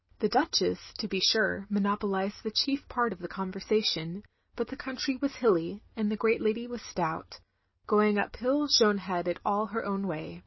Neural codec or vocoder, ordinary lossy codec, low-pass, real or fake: none; MP3, 24 kbps; 7.2 kHz; real